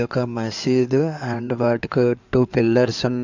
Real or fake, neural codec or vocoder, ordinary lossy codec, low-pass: fake; codec, 16 kHz in and 24 kHz out, 2.2 kbps, FireRedTTS-2 codec; none; 7.2 kHz